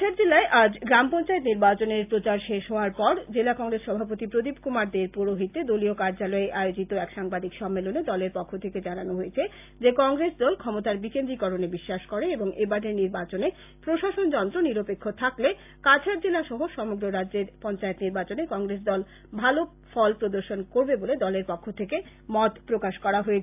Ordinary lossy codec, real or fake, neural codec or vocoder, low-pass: AAC, 32 kbps; real; none; 3.6 kHz